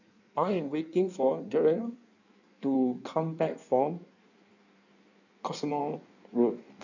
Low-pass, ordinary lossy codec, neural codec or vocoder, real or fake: 7.2 kHz; none; codec, 16 kHz in and 24 kHz out, 1.1 kbps, FireRedTTS-2 codec; fake